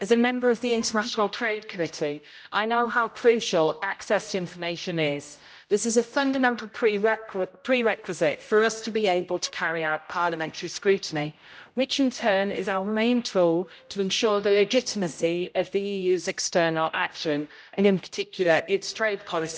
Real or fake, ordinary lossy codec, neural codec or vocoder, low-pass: fake; none; codec, 16 kHz, 0.5 kbps, X-Codec, HuBERT features, trained on general audio; none